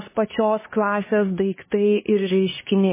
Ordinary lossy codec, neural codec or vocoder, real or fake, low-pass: MP3, 16 kbps; codec, 16 kHz, 2 kbps, X-Codec, HuBERT features, trained on LibriSpeech; fake; 3.6 kHz